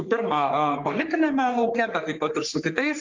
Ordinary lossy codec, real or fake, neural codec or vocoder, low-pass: Opus, 32 kbps; fake; codec, 44.1 kHz, 3.4 kbps, Pupu-Codec; 7.2 kHz